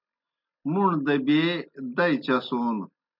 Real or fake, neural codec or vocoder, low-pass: real; none; 5.4 kHz